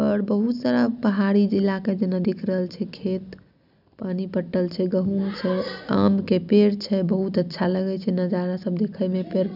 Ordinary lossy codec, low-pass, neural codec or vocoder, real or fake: none; 5.4 kHz; none; real